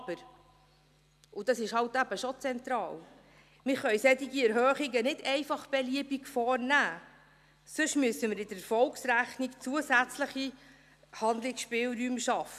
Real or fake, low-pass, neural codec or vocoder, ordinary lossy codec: real; 14.4 kHz; none; none